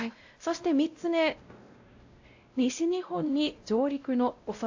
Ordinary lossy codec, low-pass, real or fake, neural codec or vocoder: AAC, 48 kbps; 7.2 kHz; fake; codec, 16 kHz, 0.5 kbps, X-Codec, WavLM features, trained on Multilingual LibriSpeech